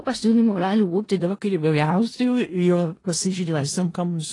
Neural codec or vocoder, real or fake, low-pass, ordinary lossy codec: codec, 16 kHz in and 24 kHz out, 0.4 kbps, LongCat-Audio-Codec, four codebook decoder; fake; 10.8 kHz; AAC, 32 kbps